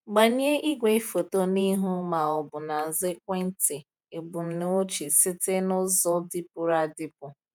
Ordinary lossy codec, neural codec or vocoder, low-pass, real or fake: none; vocoder, 48 kHz, 128 mel bands, Vocos; none; fake